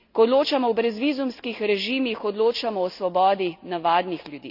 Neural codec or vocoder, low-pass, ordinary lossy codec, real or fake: none; 5.4 kHz; none; real